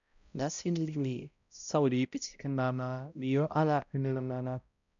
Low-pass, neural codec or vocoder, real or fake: 7.2 kHz; codec, 16 kHz, 0.5 kbps, X-Codec, HuBERT features, trained on balanced general audio; fake